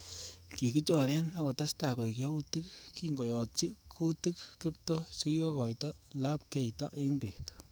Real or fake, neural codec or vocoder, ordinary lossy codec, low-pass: fake; codec, 44.1 kHz, 2.6 kbps, SNAC; none; none